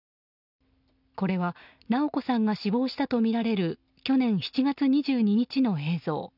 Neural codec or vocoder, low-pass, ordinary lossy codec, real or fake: none; 5.4 kHz; none; real